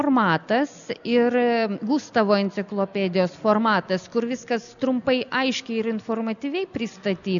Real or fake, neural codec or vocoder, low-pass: real; none; 7.2 kHz